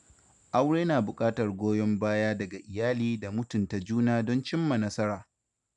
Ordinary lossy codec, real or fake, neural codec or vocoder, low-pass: none; real; none; 10.8 kHz